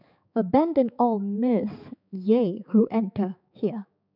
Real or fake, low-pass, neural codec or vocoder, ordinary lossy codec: fake; 5.4 kHz; codec, 16 kHz, 4 kbps, X-Codec, HuBERT features, trained on balanced general audio; none